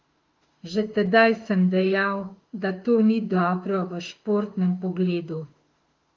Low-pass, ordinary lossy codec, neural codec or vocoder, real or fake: 7.2 kHz; Opus, 32 kbps; autoencoder, 48 kHz, 32 numbers a frame, DAC-VAE, trained on Japanese speech; fake